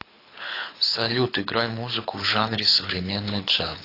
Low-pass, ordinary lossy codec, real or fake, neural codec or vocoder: 5.4 kHz; AAC, 32 kbps; fake; codec, 24 kHz, 6 kbps, HILCodec